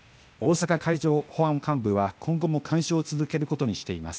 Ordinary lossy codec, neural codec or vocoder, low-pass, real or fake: none; codec, 16 kHz, 0.8 kbps, ZipCodec; none; fake